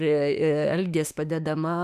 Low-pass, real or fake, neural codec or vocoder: 14.4 kHz; fake; autoencoder, 48 kHz, 32 numbers a frame, DAC-VAE, trained on Japanese speech